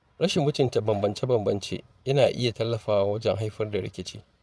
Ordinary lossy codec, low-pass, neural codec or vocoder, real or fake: none; none; vocoder, 22.05 kHz, 80 mel bands, Vocos; fake